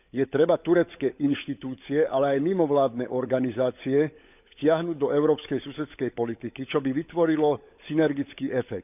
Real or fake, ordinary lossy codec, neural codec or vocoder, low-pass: fake; none; codec, 16 kHz, 16 kbps, FunCodec, trained on LibriTTS, 50 frames a second; 3.6 kHz